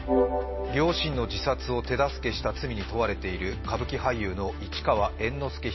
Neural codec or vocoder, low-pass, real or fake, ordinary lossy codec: none; 7.2 kHz; real; MP3, 24 kbps